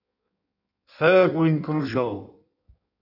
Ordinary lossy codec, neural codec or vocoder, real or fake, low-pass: AAC, 48 kbps; codec, 16 kHz in and 24 kHz out, 1.1 kbps, FireRedTTS-2 codec; fake; 5.4 kHz